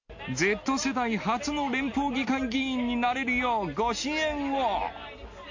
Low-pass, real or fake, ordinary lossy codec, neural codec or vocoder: 7.2 kHz; real; MP3, 48 kbps; none